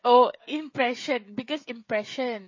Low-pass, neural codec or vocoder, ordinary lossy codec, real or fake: 7.2 kHz; codec, 16 kHz, 16 kbps, FreqCodec, smaller model; MP3, 32 kbps; fake